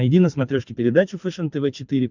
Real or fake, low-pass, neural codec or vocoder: fake; 7.2 kHz; codec, 24 kHz, 6 kbps, HILCodec